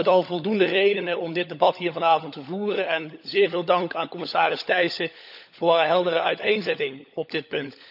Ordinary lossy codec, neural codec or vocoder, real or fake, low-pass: none; codec, 16 kHz, 16 kbps, FunCodec, trained on LibriTTS, 50 frames a second; fake; 5.4 kHz